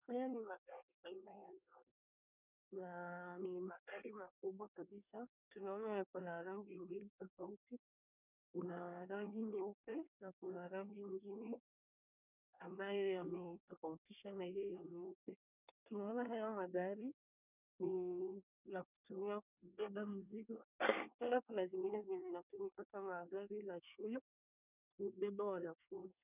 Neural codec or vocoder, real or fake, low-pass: codec, 24 kHz, 1 kbps, SNAC; fake; 3.6 kHz